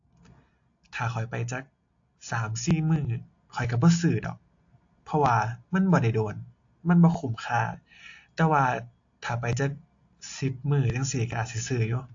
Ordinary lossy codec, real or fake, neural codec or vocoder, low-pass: AAC, 48 kbps; real; none; 7.2 kHz